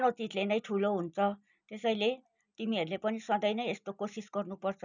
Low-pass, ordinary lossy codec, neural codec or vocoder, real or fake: 7.2 kHz; none; none; real